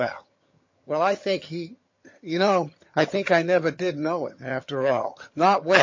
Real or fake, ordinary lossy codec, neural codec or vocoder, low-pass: fake; MP3, 32 kbps; vocoder, 22.05 kHz, 80 mel bands, HiFi-GAN; 7.2 kHz